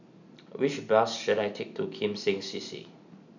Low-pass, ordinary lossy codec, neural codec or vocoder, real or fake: 7.2 kHz; none; none; real